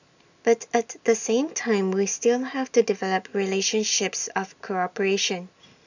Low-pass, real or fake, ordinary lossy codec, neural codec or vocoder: 7.2 kHz; real; none; none